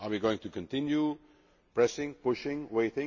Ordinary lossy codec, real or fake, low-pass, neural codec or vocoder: MP3, 32 kbps; real; 7.2 kHz; none